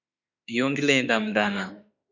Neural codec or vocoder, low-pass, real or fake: autoencoder, 48 kHz, 32 numbers a frame, DAC-VAE, trained on Japanese speech; 7.2 kHz; fake